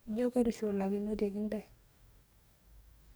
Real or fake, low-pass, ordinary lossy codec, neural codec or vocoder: fake; none; none; codec, 44.1 kHz, 2.6 kbps, DAC